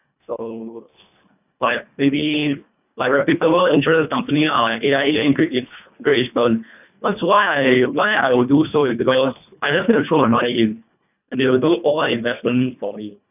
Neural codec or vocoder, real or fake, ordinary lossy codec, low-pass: codec, 24 kHz, 1.5 kbps, HILCodec; fake; none; 3.6 kHz